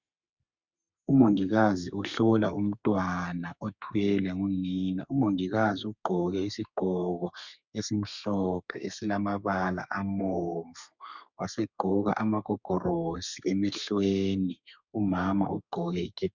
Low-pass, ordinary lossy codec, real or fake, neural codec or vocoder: 7.2 kHz; Opus, 64 kbps; fake; codec, 32 kHz, 1.9 kbps, SNAC